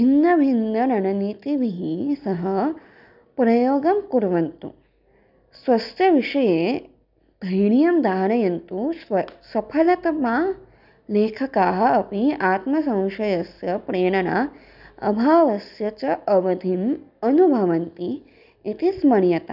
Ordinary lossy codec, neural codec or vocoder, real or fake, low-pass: none; none; real; 5.4 kHz